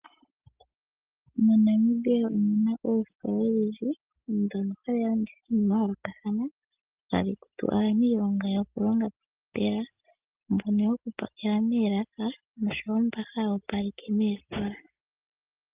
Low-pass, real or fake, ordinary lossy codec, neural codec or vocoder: 3.6 kHz; real; Opus, 32 kbps; none